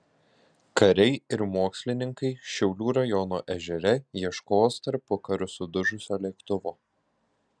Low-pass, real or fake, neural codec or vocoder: 9.9 kHz; real; none